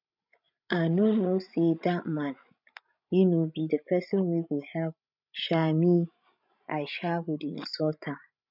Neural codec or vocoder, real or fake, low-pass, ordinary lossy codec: codec, 16 kHz, 16 kbps, FreqCodec, larger model; fake; 5.4 kHz; none